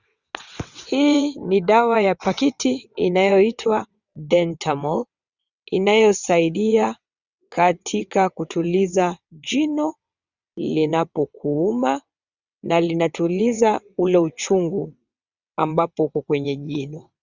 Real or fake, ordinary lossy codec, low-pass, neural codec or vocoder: fake; Opus, 64 kbps; 7.2 kHz; vocoder, 22.05 kHz, 80 mel bands, WaveNeXt